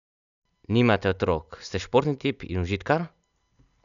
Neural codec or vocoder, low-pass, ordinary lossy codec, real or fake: none; 7.2 kHz; none; real